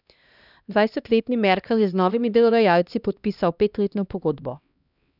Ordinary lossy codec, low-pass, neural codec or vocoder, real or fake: none; 5.4 kHz; codec, 16 kHz, 1 kbps, X-Codec, HuBERT features, trained on LibriSpeech; fake